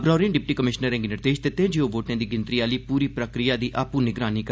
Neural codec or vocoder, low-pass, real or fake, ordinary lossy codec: none; none; real; none